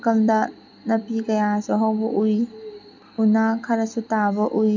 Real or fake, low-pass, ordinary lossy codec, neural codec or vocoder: real; 7.2 kHz; none; none